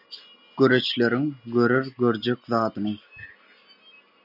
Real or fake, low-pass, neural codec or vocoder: real; 5.4 kHz; none